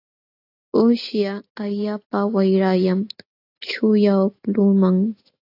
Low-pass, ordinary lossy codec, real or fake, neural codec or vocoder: 5.4 kHz; AAC, 32 kbps; real; none